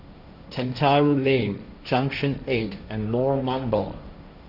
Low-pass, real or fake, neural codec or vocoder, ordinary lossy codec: 5.4 kHz; fake; codec, 16 kHz, 1.1 kbps, Voila-Tokenizer; none